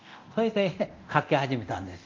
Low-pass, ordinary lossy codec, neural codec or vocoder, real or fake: 7.2 kHz; Opus, 24 kbps; codec, 24 kHz, 0.9 kbps, DualCodec; fake